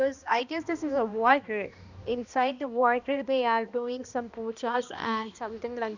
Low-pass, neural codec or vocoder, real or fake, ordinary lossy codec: 7.2 kHz; codec, 16 kHz, 1 kbps, X-Codec, HuBERT features, trained on balanced general audio; fake; none